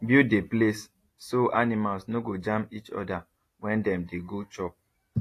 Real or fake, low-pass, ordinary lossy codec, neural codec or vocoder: real; 14.4 kHz; AAC, 48 kbps; none